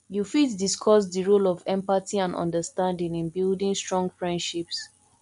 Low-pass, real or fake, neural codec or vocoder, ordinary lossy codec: 10.8 kHz; real; none; MP3, 64 kbps